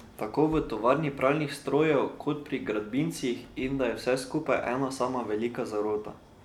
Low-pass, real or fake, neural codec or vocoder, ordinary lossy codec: 19.8 kHz; real; none; none